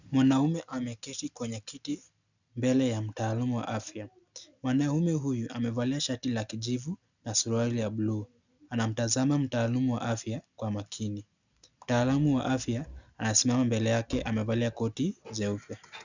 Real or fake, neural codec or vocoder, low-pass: real; none; 7.2 kHz